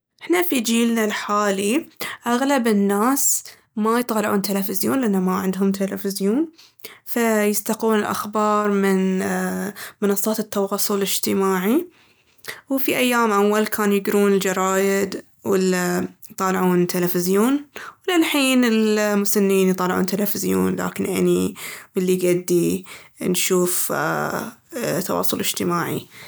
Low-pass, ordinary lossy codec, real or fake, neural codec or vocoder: none; none; real; none